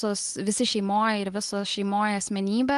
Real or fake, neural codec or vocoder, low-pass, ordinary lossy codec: real; none; 10.8 kHz; Opus, 24 kbps